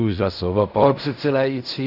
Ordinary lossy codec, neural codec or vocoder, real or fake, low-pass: AAC, 48 kbps; codec, 16 kHz in and 24 kHz out, 0.4 kbps, LongCat-Audio-Codec, fine tuned four codebook decoder; fake; 5.4 kHz